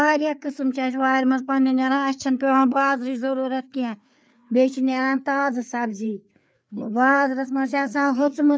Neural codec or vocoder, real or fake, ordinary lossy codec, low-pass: codec, 16 kHz, 4 kbps, FreqCodec, larger model; fake; none; none